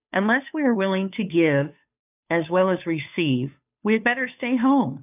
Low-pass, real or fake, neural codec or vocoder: 3.6 kHz; fake; codec, 16 kHz, 2 kbps, FunCodec, trained on Chinese and English, 25 frames a second